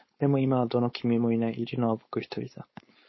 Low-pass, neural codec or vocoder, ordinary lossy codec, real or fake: 7.2 kHz; codec, 16 kHz, 4.8 kbps, FACodec; MP3, 24 kbps; fake